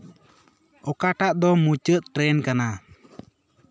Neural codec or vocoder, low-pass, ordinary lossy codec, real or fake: none; none; none; real